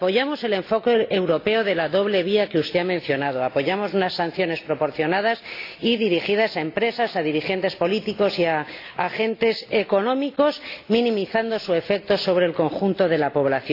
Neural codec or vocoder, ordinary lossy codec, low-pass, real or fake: none; AAC, 32 kbps; 5.4 kHz; real